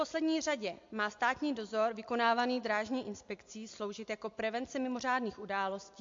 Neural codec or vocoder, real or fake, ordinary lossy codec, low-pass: none; real; MP3, 48 kbps; 7.2 kHz